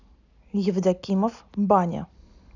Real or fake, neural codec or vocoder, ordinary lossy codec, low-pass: real; none; none; 7.2 kHz